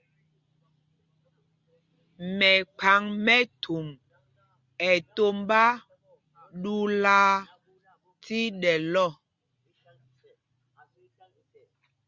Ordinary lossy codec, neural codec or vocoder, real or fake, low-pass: Opus, 64 kbps; none; real; 7.2 kHz